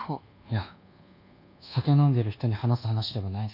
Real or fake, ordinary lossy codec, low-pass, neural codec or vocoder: fake; none; 5.4 kHz; codec, 24 kHz, 1.2 kbps, DualCodec